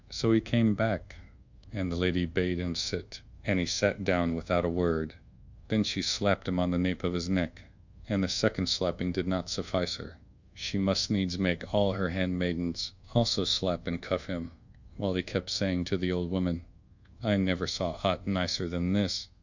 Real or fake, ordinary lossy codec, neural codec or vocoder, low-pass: fake; Opus, 64 kbps; codec, 24 kHz, 1.2 kbps, DualCodec; 7.2 kHz